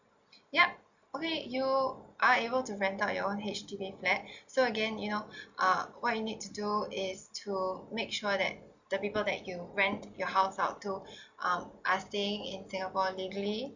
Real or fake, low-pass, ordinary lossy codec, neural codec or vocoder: real; 7.2 kHz; none; none